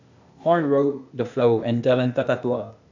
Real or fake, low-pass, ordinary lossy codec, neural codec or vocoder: fake; 7.2 kHz; none; codec, 16 kHz, 0.8 kbps, ZipCodec